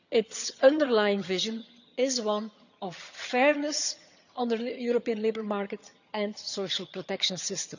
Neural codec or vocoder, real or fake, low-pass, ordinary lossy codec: vocoder, 22.05 kHz, 80 mel bands, HiFi-GAN; fake; 7.2 kHz; none